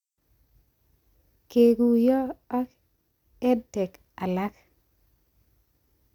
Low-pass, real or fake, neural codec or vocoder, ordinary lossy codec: 19.8 kHz; real; none; none